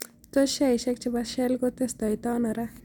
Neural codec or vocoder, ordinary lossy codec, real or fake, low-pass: none; none; real; 19.8 kHz